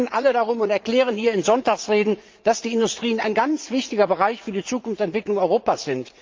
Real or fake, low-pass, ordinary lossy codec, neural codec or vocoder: fake; 7.2 kHz; Opus, 24 kbps; vocoder, 44.1 kHz, 80 mel bands, Vocos